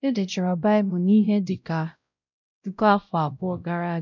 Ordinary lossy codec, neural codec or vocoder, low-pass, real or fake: none; codec, 16 kHz, 0.5 kbps, X-Codec, WavLM features, trained on Multilingual LibriSpeech; 7.2 kHz; fake